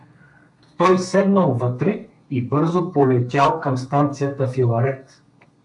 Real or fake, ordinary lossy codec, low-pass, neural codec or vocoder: fake; MP3, 64 kbps; 10.8 kHz; codec, 32 kHz, 1.9 kbps, SNAC